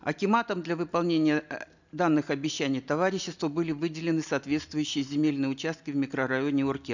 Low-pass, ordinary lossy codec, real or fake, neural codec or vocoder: 7.2 kHz; none; real; none